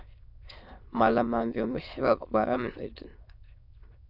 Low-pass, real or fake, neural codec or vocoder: 5.4 kHz; fake; autoencoder, 22.05 kHz, a latent of 192 numbers a frame, VITS, trained on many speakers